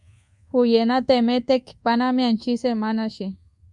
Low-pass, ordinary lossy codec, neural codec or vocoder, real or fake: 10.8 kHz; Opus, 64 kbps; codec, 24 kHz, 1.2 kbps, DualCodec; fake